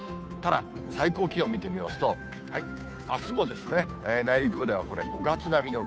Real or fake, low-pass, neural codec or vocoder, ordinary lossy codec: fake; none; codec, 16 kHz, 2 kbps, FunCodec, trained on Chinese and English, 25 frames a second; none